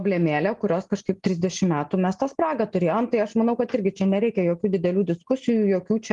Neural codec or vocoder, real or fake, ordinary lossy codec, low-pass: none; real; Opus, 24 kbps; 10.8 kHz